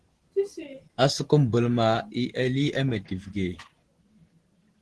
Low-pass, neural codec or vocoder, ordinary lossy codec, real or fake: 10.8 kHz; none; Opus, 16 kbps; real